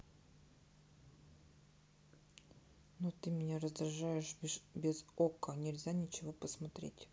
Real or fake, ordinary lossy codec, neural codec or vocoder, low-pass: real; none; none; none